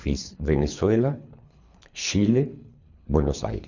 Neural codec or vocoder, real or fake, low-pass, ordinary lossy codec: codec, 24 kHz, 3 kbps, HILCodec; fake; 7.2 kHz; none